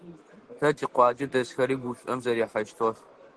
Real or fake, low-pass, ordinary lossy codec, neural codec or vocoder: real; 10.8 kHz; Opus, 16 kbps; none